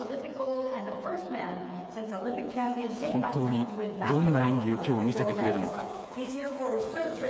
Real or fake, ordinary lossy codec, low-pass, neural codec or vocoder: fake; none; none; codec, 16 kHz, 4 kbps, FreqCodec, smaller model